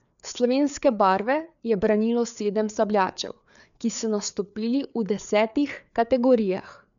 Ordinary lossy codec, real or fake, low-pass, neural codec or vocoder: MP3, 96 kbps; fake; 7.2 kHz; codec, 16 kHz, 4 kbps, FreqCodec, larger model